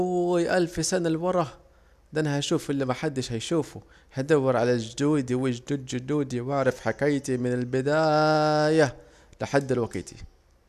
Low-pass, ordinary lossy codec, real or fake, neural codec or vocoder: 14.4 kHz; none; real; none